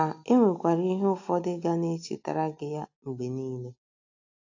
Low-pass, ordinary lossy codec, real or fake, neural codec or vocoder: 7.2 kHz; AAC, 48 kbps; real; none